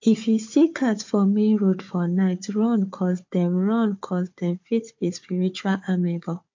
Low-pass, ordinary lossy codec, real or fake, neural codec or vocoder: 7.2 kHz; MP3, 48 kbps; fake; codec, 16 kHz, 4 kbps, FunCodec, trained on Chinese and English, 50 frames a second